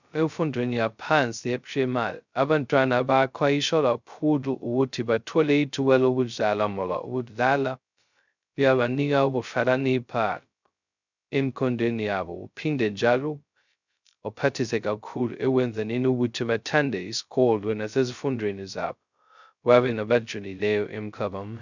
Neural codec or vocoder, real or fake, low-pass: codec, 16 kHz, 0.2 kbps, FocalCodec; fake; 7.2 kHz